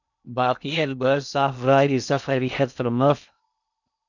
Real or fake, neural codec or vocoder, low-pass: fake; codec, 16 kHz in and 24 kHz out, 0.6 kbps, FocalCodec, streaming, 2048 codes; 7.2 kHz